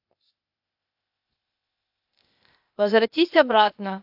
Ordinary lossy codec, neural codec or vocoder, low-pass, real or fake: AAC, 48 kbps; codec, 16 kHz, 0.8 kbps, ZipCodec; 5.4 kHz; fake